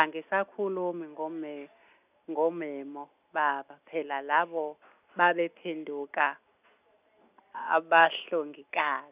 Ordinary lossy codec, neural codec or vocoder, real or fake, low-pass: none; none; real; 3.6 kHz